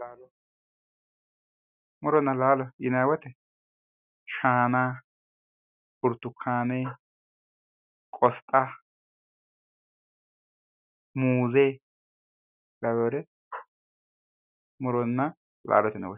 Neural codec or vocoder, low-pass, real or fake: none; 3.6 kHz; real